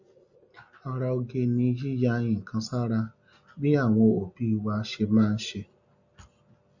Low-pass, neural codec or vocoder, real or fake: 7.2 kHz; none; real